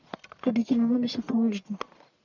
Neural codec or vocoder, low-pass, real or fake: codec, 44.1 kHz, 1.7 kbps, Pupu-Codec; 7.2 kHz; fake